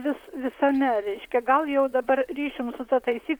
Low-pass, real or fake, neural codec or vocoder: 19.8 kHz; fake; vocoder, 44.1 kHz, 128 mel bands, Pupu-Vocoder